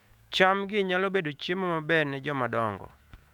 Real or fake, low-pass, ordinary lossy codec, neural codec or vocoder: fake; 19.8 kHz; none; autoencoder, 48 kHz, 128 numbers a frame, DAC-VAE, trained on Japanese speech